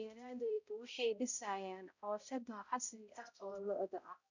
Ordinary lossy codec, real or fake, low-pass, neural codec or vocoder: none; fake; 7.2 kHz; codec, 16 kHz, 0.5 kbps, X-Codec, HuBERT features, trained on balanced general audio